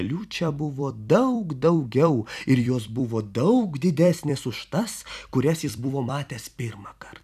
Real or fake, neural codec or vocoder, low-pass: fake; vocoder, 44.1 kHz, 128 mel bands every 256 samples, BigVGAN v2; 14.4 kHz